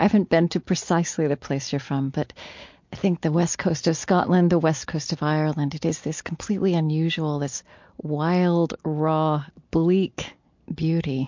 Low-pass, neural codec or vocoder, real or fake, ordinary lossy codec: 7.2 kHz; none; real; MP3, 48 kbps